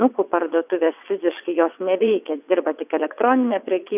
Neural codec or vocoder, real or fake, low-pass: vocoder, 22.05 kHz, 80 mel bands, WaveNeXt; fake; 3.6 kHz